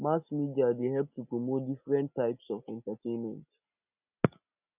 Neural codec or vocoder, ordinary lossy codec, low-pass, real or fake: none; none; 3.6 kHz; real